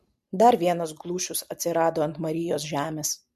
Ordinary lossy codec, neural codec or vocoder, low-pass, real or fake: MP3, 64 kbps; none; 14.4 kHz; real